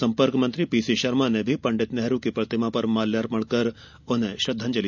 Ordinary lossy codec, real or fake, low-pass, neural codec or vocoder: none; real; 7.2 kHz; none